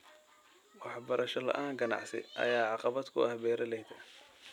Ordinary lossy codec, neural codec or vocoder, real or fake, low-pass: none; none; real; 19.8 kHz